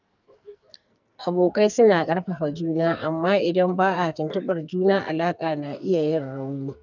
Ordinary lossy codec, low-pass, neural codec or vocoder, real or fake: none; 7.2 kHz; codec, 44.1 kHz, 2.6 kbps, SNAC; fake